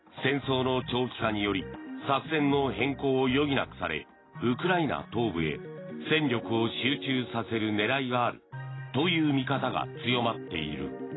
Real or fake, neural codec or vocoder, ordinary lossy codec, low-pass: real; none; AAC, 16 kbps; 7.2 kHz